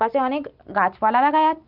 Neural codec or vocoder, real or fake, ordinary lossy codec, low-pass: none; real; Opus, 32 kbps; 5.4 kHz